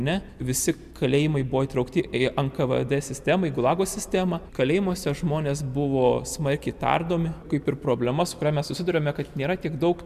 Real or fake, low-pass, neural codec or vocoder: real; 14.4 kHz; none